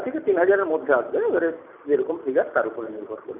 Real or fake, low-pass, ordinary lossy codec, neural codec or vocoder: real; 3.6 kHz; none; none